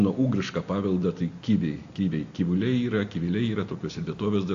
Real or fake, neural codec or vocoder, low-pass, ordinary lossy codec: real; none; 7.2 kHz; AAC, 48 kbps